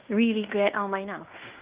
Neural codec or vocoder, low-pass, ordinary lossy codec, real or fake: codec, 16 kHz in and 24 kHz out, 0.9 kbps, LongCat-Audio-Codec, fine tuned four codebook decoder; 3.6 kHz; Opus, 32 kbps; fake